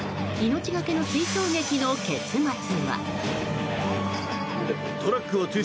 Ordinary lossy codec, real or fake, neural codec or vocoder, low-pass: none; real; none; none